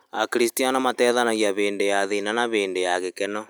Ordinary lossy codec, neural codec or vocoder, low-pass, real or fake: none; none; none; real